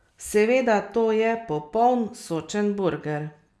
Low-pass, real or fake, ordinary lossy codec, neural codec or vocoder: none; real; none; none